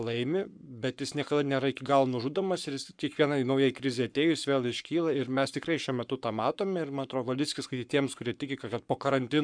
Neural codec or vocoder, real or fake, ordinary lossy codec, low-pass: codec, 44.1 kHz, 7.8 kbps, Pupu-Codec; fake; MP3, 96 kbps; 9.9 kHz